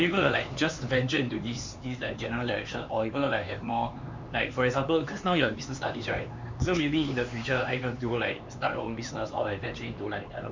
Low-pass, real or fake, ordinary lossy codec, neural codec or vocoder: 7.2 kHz; fake; MP3, 64 kbps; codec, 16 kHz, 4 kbps, X-Codec, HuBERT features, trained on LibriSpeech